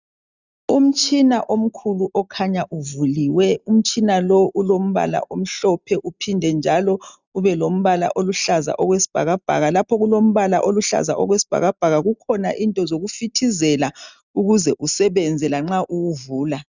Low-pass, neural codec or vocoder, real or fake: 7.2 kHz; none; real